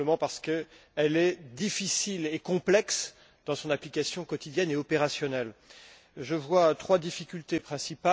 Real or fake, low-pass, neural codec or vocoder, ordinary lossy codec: real; none; none; none